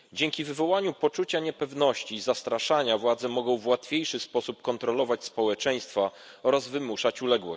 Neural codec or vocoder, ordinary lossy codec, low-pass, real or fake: none; none; none; real